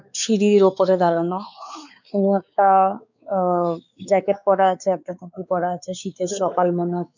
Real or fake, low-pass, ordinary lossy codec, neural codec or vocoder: fake; 7.2 kHz; none; codec, 16 kHz, 4 kbps, X-Codec, WavLM features, trained on Multilingual LibriSpeech